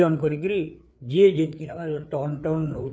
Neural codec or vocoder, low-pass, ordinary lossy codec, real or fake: codec, 16 kHz, 4 kbps, FreqCodec, larger model; none; none; fake